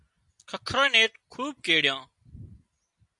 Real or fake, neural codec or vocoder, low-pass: real; none; 10.8 kHz